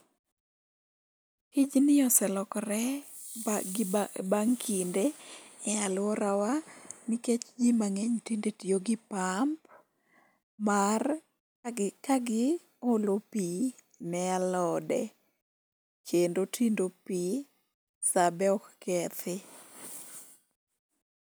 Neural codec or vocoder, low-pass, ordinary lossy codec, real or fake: none; none; none; real